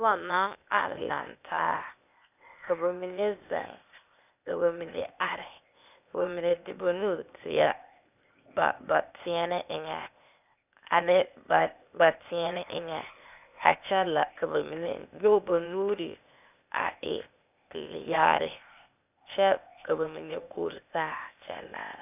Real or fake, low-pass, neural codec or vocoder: fake; 3.6 kHz; codec, 16 kHz, 0.8 kbps, ZipCodec